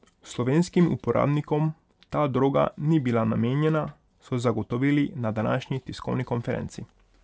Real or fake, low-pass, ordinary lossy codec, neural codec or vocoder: real; none; none; none